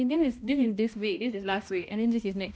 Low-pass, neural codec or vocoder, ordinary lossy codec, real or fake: none; codec, 16 kHz, 1 kbps, X-Codec, HuBERT features, trained on balanced general audio; none; fake